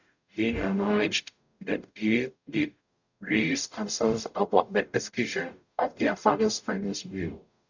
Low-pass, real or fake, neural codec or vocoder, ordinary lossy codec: 7.2 kHz; fake; codec, 44.1 kHz, 0.9 kbps, DAC; none